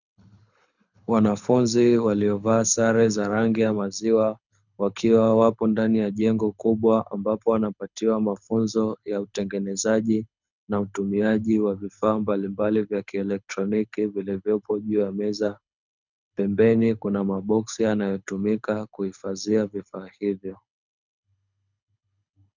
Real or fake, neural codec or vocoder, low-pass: fake; codec, 24 kHz, 6 kbps, HILCodec; 7.2 kHz